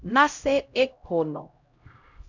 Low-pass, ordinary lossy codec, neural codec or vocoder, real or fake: 7.2 kHz; Opus, 64 kbps; codec, 16 kHz, 0.5 kbps, X-Codec, HuBERT features, trained on LibriSpeech; fake